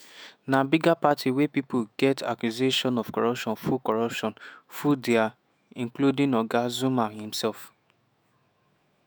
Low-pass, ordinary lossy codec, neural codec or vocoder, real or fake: none; none; autoencoder, 48 kHz, 128 numbers a frame, DAC-VAE, trained on Japanese speech; fake